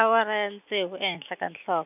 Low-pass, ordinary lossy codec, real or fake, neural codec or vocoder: 3.6 kHz; none; real; none